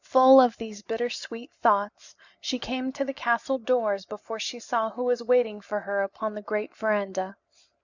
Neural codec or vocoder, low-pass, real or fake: none; 7.2 kHz; real